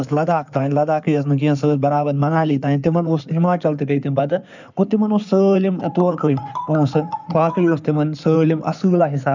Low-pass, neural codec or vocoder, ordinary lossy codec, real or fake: 7.2 kHz; codec, 16 kHz in and 24 kHz out, 2.2 kbps, FireRedTTS-2 codec; none; fake